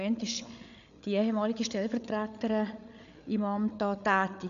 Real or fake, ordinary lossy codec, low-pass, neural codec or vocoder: fake; none; 7.2 kHz; codec, 16 kHz, 8 kbps, FreqCodec, larger model